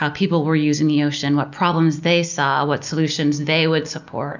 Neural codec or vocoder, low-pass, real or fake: none; 7.2 kHz; real